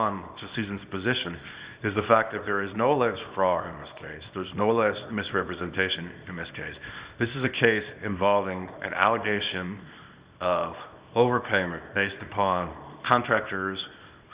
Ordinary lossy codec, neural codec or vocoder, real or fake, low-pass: Opus, 64 kbps; codec, 24 kHz, 0.9 kbps, WavTokenizer, small release; fake; 3.6 kHz